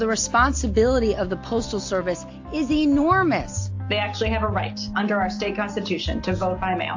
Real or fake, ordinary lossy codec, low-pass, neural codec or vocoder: real; AAC, 48 kbps; 7.2 kHz; none